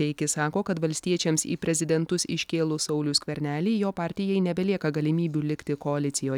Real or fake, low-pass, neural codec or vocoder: real; 19.8 kHz; none